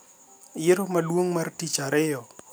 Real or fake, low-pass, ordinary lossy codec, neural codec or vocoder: real; none; none; none